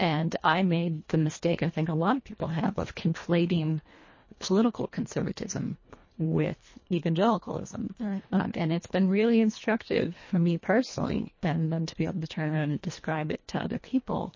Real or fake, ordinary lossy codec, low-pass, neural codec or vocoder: fake; MP3, 32 kbps; 7.2 kHz; codec, 24 kHz, 1.5 kbps, HILCodec